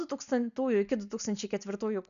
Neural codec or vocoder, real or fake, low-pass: none; real; 7.2 kHz